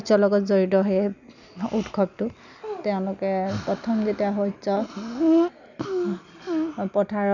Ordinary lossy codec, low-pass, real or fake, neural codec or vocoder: none; 7.2 kHz; real; none